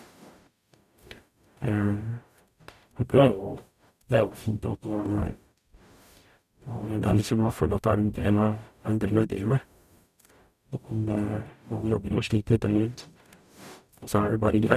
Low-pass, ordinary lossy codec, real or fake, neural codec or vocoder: 14.4 kHz; none; fake; codec, 44.1 kHz, 0.9 kbps, DAC